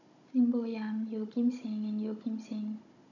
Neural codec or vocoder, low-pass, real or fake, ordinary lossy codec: codec, 16 kHz, 16 kbps, FunCodec, trained on Chinese and English, 50 frames a second; 7.2 kHz; fake; none